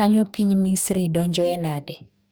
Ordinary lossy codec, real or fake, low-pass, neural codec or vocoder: none; fake; none; codec, 44.1 kHz, 2.6 kbps, DAC